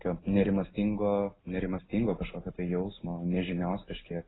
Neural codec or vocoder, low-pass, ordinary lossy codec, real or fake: none; 7.2 kHz; AAC, 16 kbps; real